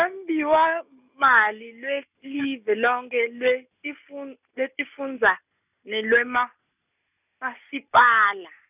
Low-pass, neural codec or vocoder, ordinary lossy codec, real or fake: 3.6 kHz; none; none; real